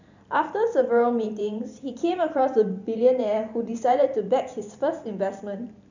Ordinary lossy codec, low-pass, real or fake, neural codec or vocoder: none; 7.2 kHz; fake; vocoder, 44.1 kHz, 128 mel bands every 512 samples, BigVGAN v2